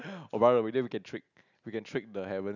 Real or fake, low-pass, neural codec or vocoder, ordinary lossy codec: real; 7.2 kHz; none; none